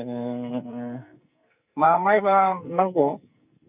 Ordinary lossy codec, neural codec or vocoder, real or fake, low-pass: none; codec, 44.1 kHz, 2.6 kbps, SNAC; fake; 3.6 kHz